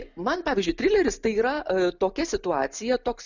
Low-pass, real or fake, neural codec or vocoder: 7.2 kHz; real; none